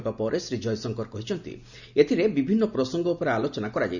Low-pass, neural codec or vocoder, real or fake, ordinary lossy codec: 7.2 kHz; none; real; none